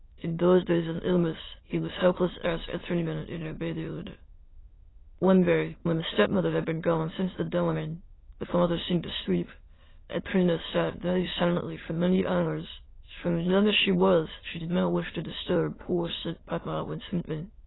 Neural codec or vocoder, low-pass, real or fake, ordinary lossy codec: autoencoder, 22.05 kHz, a latent of 192 numbers a frame, VITS, trained on many speakers; 7.2 kHz; fake; AAC, 16 kbps